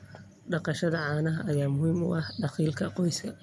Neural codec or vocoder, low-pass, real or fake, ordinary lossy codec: none; none; real; none